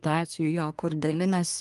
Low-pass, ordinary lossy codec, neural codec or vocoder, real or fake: 10.8 kHz; Opus, 32 kbps; codec, 24 kHz, 1 kbps, SNAC; fake